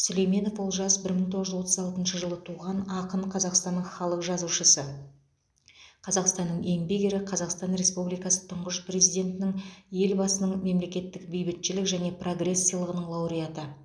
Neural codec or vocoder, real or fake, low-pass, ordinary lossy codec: none; real; none; none